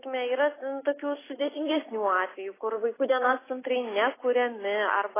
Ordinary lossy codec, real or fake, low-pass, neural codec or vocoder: AAC, 16 kbps; real; 3.6 kHz; none